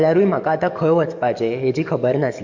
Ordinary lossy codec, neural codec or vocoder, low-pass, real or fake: MP3, 64 kbps; codec, 44.1 kHz, 7.8 kbps, DAC; 7.2 kHz; fake